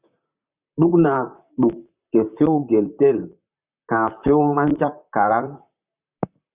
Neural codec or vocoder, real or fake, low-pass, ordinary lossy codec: vocoder, 44.1 kHz, 128 mel bands, Pupu-Vocoder; fake; 3.6 kHz; Opus, 64 kbps